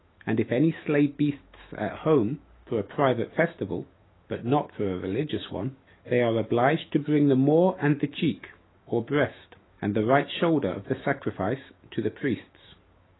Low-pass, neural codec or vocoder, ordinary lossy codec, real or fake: 7.2 kHz; autoencoder, 48 kHz, 128 numbers a frame, DAC-VAE, trained on Japanese speech; AAC, 16 kbps; fake